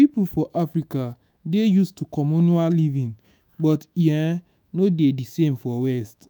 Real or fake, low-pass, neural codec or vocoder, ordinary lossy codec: fake; none; autoencoder, 48 kHz, 128 numbers a frame, DAC-VAE, trained on Japanese speech; none